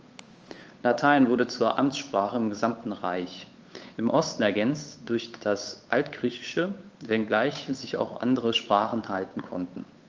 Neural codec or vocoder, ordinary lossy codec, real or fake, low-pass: codec, 16 kHz, 8 kbps, FunCodec, trained on Chinese and English, 25 frames a second; Opus, 24 kbps; fake; 7.2 kHz